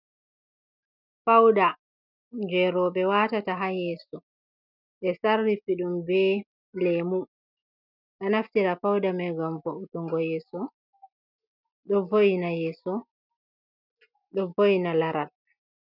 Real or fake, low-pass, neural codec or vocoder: real; 5.4 kHz; none